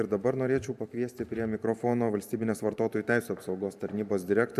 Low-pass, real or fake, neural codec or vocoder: 14.4 kHz; fake; vocoder, 44.1 kHz, 128 mel bands every 512 samples, BigVGAN v2